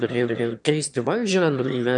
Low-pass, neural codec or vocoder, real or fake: 9.9 kHz; autoencoder, 22.05 kHz, a latent of 192 numbers a frame, VITS, trained on one speaker; fake